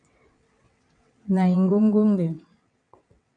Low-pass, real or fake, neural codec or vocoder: 9.9 kHz; fake; vocoder, 22.05 kHz, 80 mel bands, WaveNeXt